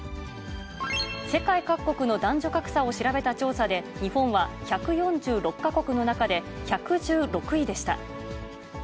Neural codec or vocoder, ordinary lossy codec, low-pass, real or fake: none; none; none; real